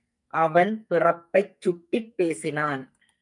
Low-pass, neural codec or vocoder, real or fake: 10.8 kHz; codec, 44.1 kHz, 2.6 kbps, SNAC; fake